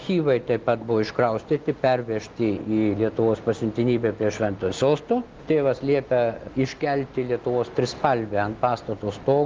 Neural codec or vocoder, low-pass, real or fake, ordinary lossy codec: none; 7.2 kHz; real; Opus, 32 kbps